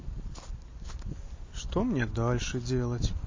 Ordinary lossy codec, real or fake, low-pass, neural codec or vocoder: MP3, 32 kbps; real; 7.2 kHz; none